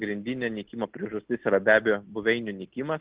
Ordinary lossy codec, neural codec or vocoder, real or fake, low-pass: Opus, 16 kbps; none; real; 3.6 kHz